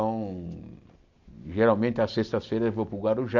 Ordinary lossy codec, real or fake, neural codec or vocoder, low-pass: none; real; none; 7.2 kHz